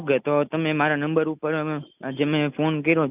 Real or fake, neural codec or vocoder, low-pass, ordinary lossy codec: real; none; 3.6 kHz; none